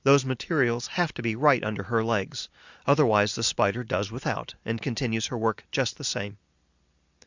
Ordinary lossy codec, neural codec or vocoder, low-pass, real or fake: Opus, 64 kbps; none; 7.2 kHz; real